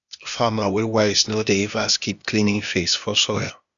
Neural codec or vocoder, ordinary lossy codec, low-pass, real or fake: codec, 16 kHz, 0.8 kbps, ZipCodec; none; 7.2 kHz; fake